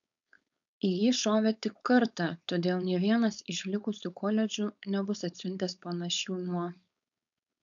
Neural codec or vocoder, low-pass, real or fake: codec, 16 kHz, 4.8 kbps, FACodec; 7.2 kHz; fake